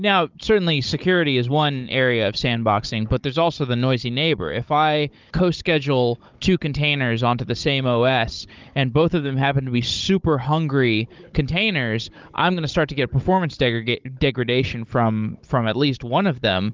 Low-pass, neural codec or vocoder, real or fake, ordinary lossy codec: 7.2 kHz; codec, 16 kHz, 16 kbps, FunCodec, trained on Chinese and English, 50 frames a second; fake; Opus, 24 kbps